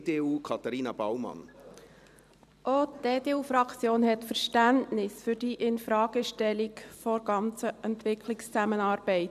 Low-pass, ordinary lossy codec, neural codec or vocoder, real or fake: 14.4 kHz; none; vocoder, 44.1 kHz, 128 mel bands every 512 samples, BigVGAN v2; fake